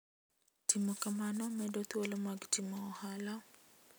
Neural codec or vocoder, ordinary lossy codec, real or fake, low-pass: none; none; real; none